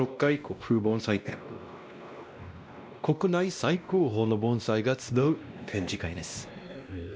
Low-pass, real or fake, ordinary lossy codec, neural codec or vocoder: none; fake; none; codec, 16 kHz, 1 kbps, X-Codec, WavLM features, trained on Multilingual LibriSpeech